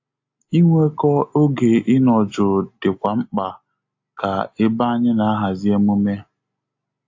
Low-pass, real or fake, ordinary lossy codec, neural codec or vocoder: 7.2 kHz; real; AAC, 48 kbps; none